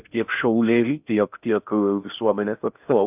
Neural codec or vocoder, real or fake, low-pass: codec, 16 kHz in and 24 kHz out, 0.6 kbps, FocalCodec, streaming, 4096 codes; fake; 3.6 kHz